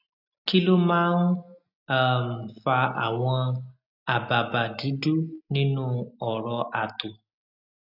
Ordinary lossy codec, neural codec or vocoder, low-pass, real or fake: none; none; 5.4 kHz; real